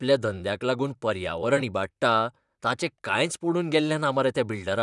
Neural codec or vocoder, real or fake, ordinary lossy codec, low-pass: vocoder, 44.1 kHz, 128 mel bands, Pupu-Vocoder; fake; none; 10.8 kHz